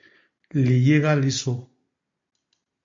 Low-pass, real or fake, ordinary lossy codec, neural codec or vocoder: 7.2 kHz; real; MP3, 48 kbps; none